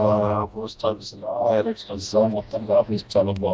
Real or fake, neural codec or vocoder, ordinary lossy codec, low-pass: fake; codec, 16 kHz, 1 kbps, FreqCodec, smaller model; none; none